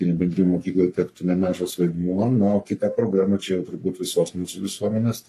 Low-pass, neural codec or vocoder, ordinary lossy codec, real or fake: 14.4 kHz; codec, 44.1 kHz, 3.4 kbps, Pupu-Codec; AAC, 64 kbps; fake